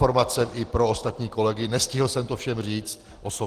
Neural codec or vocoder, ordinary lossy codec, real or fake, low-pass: none; Opus, 16 kbps; real; 14.4 kHz